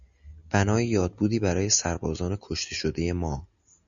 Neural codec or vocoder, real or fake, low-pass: none; real; 7.2 kHz